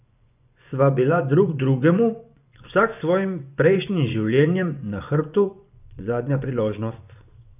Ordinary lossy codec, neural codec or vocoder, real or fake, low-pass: none; vocoder, 24 kHz, 100 mel bands, Vocos; fake; 3.6 kHz